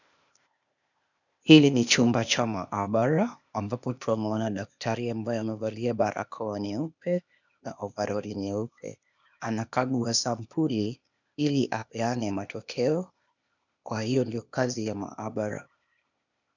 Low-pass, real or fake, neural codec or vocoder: 7.2 kHz; fake; codec, 16 kHz, 0.8 kbps, ZipCodec